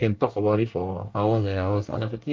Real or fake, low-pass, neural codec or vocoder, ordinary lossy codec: fake; 7.2 kHz; codec, 24 kHz, 1 kbps, SNAC; Opus, 32 kbps